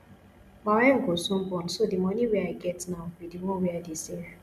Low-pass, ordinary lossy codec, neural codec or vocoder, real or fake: 14.4 kHz; none; none; real